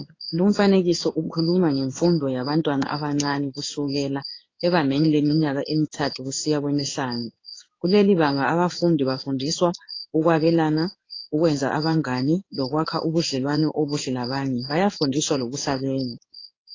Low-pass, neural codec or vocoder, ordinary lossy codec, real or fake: 7.2 kHz; codec, 16 kHz in and 24 kHz out, 1 kbps, XY-Tokenizer; AAC, 32 kbps; fake